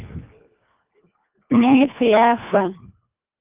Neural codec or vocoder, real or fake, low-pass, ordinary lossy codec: codec, 24 kHz, 1.5 kbps, HILCodec; fake; 3.6 kHz; Opus, 24 kbps